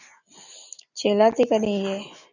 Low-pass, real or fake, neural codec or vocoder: 7.2 kHz; real; none